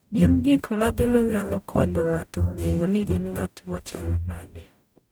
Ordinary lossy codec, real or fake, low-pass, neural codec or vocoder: none; fake; none; codec, 44.1 kHz, 0.9 kbps, DAC